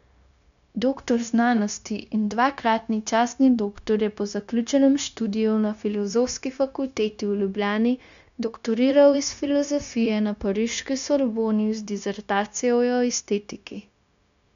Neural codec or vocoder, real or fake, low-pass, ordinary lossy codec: codec, 16 kHz, 0.9 kbps, LongCat-Audio-Codec; fake; 7.2 kHz; none